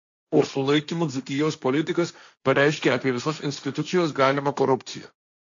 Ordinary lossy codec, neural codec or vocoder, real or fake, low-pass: AAC, 64 kbps; codec, 16 kHz, 1.1 kbps, Voila-Tokenizer; fake; 7.2 kHz